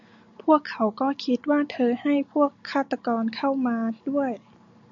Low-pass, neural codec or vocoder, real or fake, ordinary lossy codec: 7.2 kHz; none; real; MP3, 64 kbps